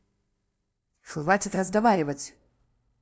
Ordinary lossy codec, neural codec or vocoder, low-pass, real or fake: none; codec, 16 kHz, 0.5 kbps, FunCodec, trained on LibriTTS, 25 frames a second; none; fake